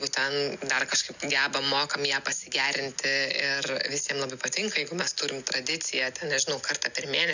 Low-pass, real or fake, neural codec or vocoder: 7.2 kHz; real; none